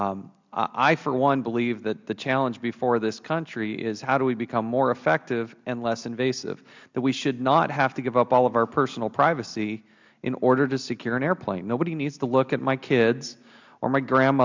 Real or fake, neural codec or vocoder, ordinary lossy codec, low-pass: real; none; MP3, 64 kbps; 7.2 kHz